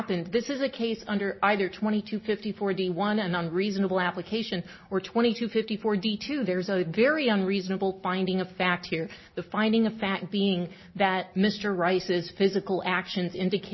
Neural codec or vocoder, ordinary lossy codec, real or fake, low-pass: none; MP3, 24 kbps; real; 7.2 kHz